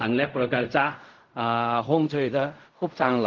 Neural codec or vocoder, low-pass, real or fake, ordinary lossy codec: codec, 16 kHz in and 24 kHz out, 0.4 kbps, LongCat-Audio-Codec, fine tuned four codebook decoder; 7.2 kHz; fake; Opus, 32 kbps